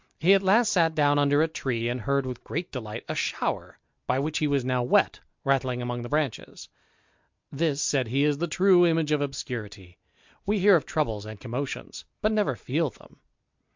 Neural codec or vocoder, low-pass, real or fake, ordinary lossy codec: none; 7.2 kHz; real; MP3, 64 kbps